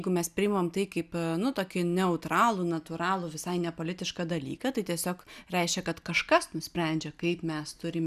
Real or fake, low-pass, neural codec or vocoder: real; 14.4 kHz; none